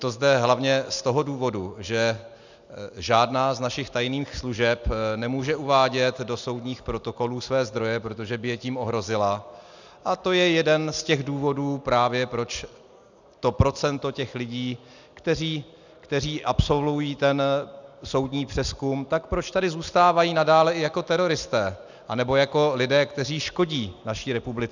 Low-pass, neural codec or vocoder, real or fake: 7.2 kHz; none; real